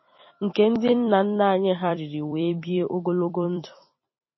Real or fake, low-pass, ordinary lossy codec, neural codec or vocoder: fake; 7.2 kHz; MP3, 24 kbps; vocoder, 44.1 kHz, 128 mel bands every 256 samples, BigVGAN v2